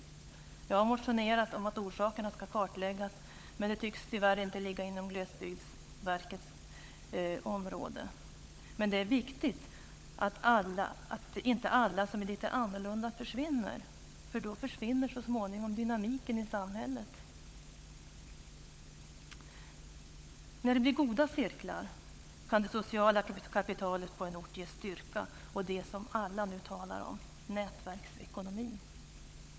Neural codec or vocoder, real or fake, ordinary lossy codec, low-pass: codec, 16 kHz, 16 kbps, FunCodec, trained on LibriTTS, 50 frames a second; fake; none; none